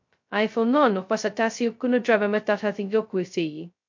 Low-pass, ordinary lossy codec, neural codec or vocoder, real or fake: 7.2 kHz; MP3, 48 kbps; codec, 16 kHz, 0.2 kbps, FocalCodec; fake